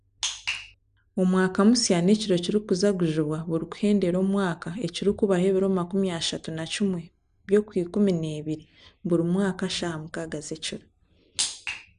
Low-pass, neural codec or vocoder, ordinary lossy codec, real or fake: 9.9 kHz; none; AAC, 96 kbps; real